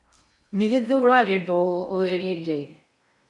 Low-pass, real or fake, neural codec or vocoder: 10.8 kHz; fake; codec, 16 kHz in and 24 kHz out, 0.6 kbps, FocalCodec, streaming, 4096 codes